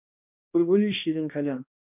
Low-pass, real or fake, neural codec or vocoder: 3.6 kHz; fake; codec, 16 kHz, 1 kbps, X-Codec, HuBERT features, trained on balanced general audio